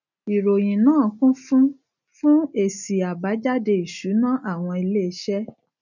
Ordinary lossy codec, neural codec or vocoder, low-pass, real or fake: none; none; 7.2 kHz; real